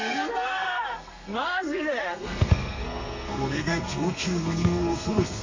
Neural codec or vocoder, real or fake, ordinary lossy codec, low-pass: codec, 32 kHz, 1.9 kbps, SNAC; fake; MP3, 48 kbps; 7.2 kHz